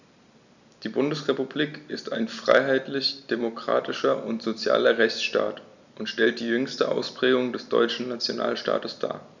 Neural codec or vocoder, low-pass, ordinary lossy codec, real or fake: none; 7.2 kHz; none; real